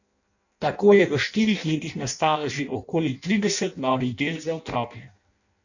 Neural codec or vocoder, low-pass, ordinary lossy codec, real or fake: codec, 16 kHz in and 24 kHz out, 0.6 kbps, FireRedTTS-2 codec; 7.2 kHz; none; fake